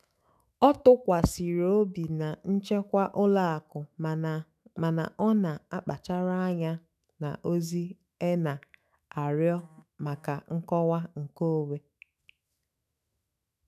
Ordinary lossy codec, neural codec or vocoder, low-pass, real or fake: none; autoencoder, 48 kHz, 128 numbers a frame, DAC-VAE, trained on Japanese speech; 14.4 kHz; fake